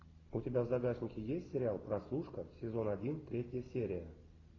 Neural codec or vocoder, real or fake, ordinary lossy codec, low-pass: none; real; AAC, 32 kbps; 7.2 kHz